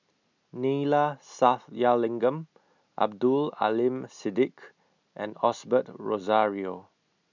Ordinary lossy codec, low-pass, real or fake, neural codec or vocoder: none; 7.2 kHz; real; none